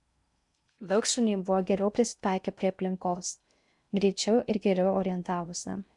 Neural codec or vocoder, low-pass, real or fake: codec, 16 kHz in and 24 kHz out, 0.6 kbps, FocalCodec, streaming, 4096 codes; 10.8 kHz; fake